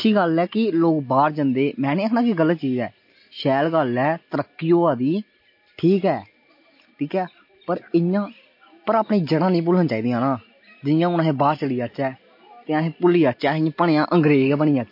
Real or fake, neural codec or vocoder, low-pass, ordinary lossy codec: real; none; 5.4 kHz; MP3, 32 kbps